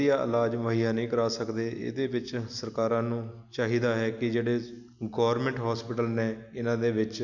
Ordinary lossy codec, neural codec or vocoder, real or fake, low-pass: none; none; real; 7.2 kHz